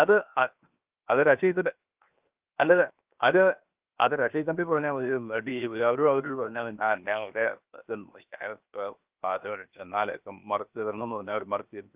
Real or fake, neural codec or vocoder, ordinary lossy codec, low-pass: fake; codec, 16 kHz, 0.7 kbps, FocalCodec; Opus, 24 kbps; 3.6 kHz